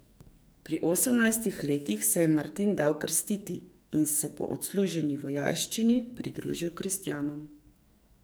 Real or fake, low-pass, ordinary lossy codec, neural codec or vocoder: fake; none; none; codec, 44.1 kHz, 2.6 kbps, SNAC